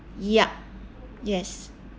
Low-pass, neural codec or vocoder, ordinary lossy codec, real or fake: none; none; none; real